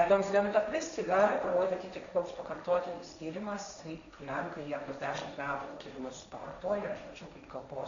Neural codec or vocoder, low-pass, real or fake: codec, 16 kHz, 1.1 kbps, Voila-Tokenizer; 7.2 kHz; fake